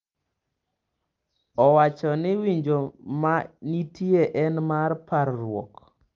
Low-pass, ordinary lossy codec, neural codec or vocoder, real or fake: 7.2 kHz; Opus, 32 kbps; none; real